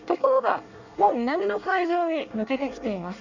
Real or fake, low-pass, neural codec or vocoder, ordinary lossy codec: fake; 7.2 kHz; codec, 24 kHz, 1 kbps, SNAC; none